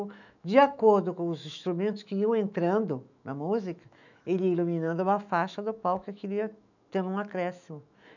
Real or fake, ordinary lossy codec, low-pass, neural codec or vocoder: fake; none; 7.2 kHz; autoencoder, 48 kHz, 128 numbers a frame, DAC-VAE, trained on Japanese speech